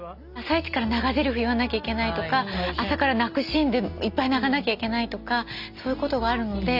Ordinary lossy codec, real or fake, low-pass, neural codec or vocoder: Opus, 64 kbps; real; 5.4 kHz; none